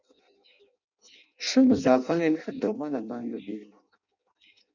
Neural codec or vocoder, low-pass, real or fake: codec, 16 kHz in and 24 kHz out, 0.6 kbps, FireRedTTS-2 codec; 7.2 kHz; fake